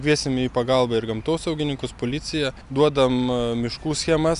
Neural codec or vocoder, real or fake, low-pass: none; real; 10.8 kHz